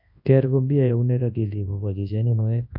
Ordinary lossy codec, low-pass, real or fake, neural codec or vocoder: AAC, 48 kbps; 5.4 kHz; fake; codec, 24 kHz, 0.9 kbps, WavTokenizer, large speech release